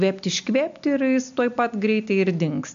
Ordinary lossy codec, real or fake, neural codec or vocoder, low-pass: MP3, 64 kbps; real; none; 7.2 kHz